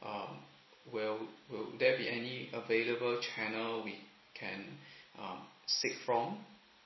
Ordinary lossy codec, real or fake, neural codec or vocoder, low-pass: MP3, 24 kbps; real; none; 7.2 kHz